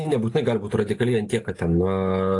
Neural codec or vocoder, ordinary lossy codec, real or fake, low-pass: none; AAC, 48 kbps; real; 10.8 kHz